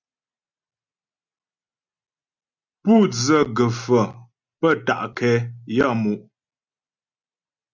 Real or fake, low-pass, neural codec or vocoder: real; 7.2 kHz; none